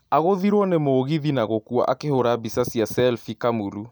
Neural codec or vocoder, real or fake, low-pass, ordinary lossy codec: none; real; none; none